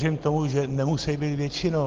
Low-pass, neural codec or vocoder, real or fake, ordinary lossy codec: 7.2 kHz; none; real; Opus, 16 kbps